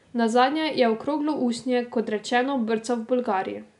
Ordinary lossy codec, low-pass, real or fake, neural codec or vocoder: none; 10.8 kHz; real; none